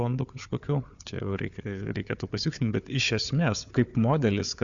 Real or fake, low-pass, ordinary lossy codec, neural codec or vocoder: fake; 7.2 kHz; Opus, 64 kbps; codec, 16 kHz, 4 kbps, FunCodec, trained on Chinese and English, 50 frames a second